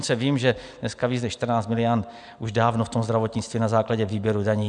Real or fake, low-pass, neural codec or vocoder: real; 9.9 kHz; none